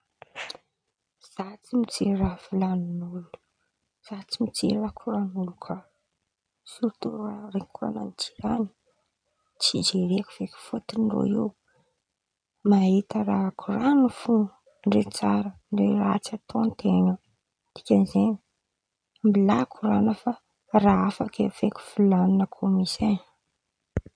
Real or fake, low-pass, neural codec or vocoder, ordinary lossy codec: real; 9.9 kHz; none; none